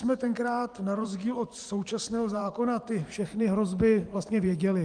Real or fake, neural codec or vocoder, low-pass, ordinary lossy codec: fake; vocoder, 44.1 kHz, 128 mel bands every 512 samples, BigVGAN v2; 9.9 kHz; Opus, 32 kbps